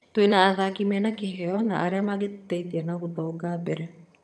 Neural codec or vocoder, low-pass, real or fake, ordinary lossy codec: vocoder, 22.05 kHz, 80 mel bands, HiFi-GAN; none; fake; none